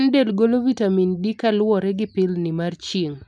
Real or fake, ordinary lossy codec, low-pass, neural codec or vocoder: real; none; none; none